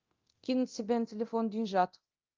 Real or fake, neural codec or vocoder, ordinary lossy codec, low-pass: fake; codec, 24 kHz, 0.9 kbps, DualCodec; Opus, 32 kbps; 7.2 kHz